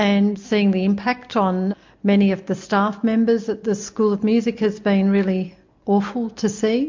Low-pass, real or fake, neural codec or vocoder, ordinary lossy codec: 7.2 kHz; real; none; MP3, 48 kbps